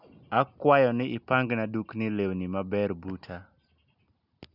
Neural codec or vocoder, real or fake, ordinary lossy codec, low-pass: none; real; none; 5.4 kHz